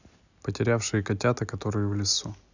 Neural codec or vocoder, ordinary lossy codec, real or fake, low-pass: none; none; real; 7.2 kHz